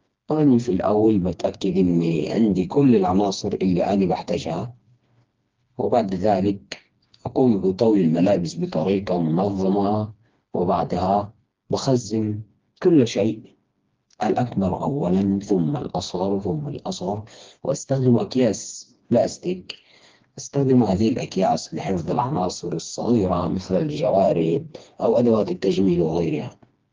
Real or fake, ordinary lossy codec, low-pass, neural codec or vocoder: fake; Opus, 32 kbps; 7.2 kHz; codec, 16 kHz, 2 kbps, FreqCodec, smaller model